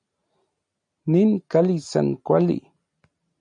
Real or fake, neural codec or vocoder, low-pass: real; none; 9.9 kHz